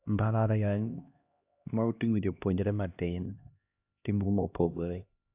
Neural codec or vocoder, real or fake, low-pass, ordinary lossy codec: codec, 16 kHz, 1 kbps, X-Codec, HuBERT features, trained on LibriSpeech; fake; 3.6 kHz; AAC, 32 kbps